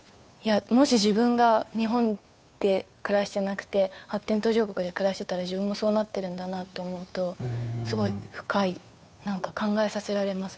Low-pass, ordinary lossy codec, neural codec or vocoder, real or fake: none; none; codec, 16 kHz, 2 kbps, FunCodec, trained on Chinese and English, 25 frames a second; fake